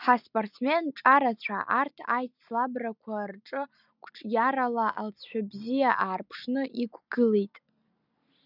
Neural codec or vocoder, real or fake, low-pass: none; real; 5.4 kHz